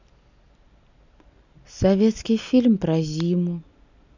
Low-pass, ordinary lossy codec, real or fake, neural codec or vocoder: 7.2 kHz; none; real; none